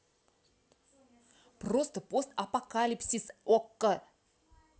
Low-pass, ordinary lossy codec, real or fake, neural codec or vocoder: none; none; real; none